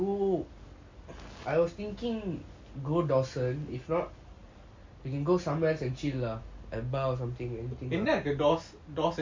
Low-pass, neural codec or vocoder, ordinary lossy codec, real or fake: 7.2 kHz; none; MP3, 48 kbps; real